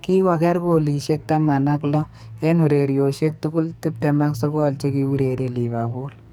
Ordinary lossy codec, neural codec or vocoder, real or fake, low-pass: none; codec, 44.1 kHz, 2.6 kbps, SNAC; fake; none